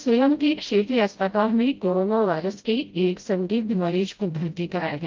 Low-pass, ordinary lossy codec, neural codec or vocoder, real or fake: 7.2 kHz; Opus, 32 kbps; codec, 16 kHz, 0.5 kbps, FreqCodec, smaller model; fake